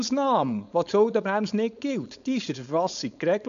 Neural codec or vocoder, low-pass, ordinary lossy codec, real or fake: codec, 16 kHz, 4.8 kbps, FACodec; 7.2 kHz; none; fake